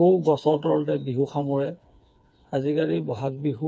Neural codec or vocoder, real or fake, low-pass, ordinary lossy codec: codec, 16 kHz, 4 kbps, FreqCodec, smaller model; fake; none; none